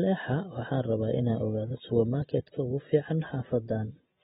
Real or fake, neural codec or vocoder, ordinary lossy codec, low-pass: real; none; AAC, 16 kbps; 19.8 kHz